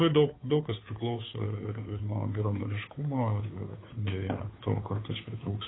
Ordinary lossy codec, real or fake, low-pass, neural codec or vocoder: AAC, 16 kbps; fake; 7.2 kHz; codec, 16 kHz, 2 kbps, FunCodec, trained on Chinese and English, 25 frames a second